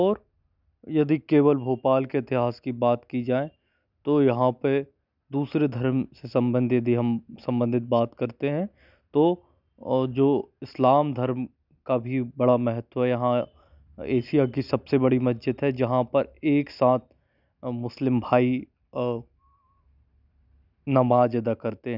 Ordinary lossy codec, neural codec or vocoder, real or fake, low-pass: none; none; real; 5.4 kHz